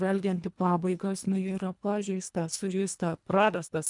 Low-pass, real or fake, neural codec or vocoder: 10.8 kHz; fake; codec, 24 kHz, 1.5 kbps, HILCodec